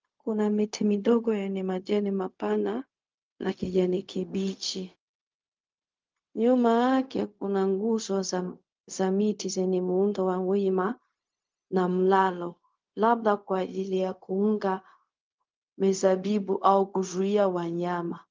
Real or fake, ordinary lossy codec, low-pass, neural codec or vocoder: fake; Opus, 24 kbps; 7.2 kHz; codec, 16 kHz, 0.4 kbps, LongCat-Audio-Codec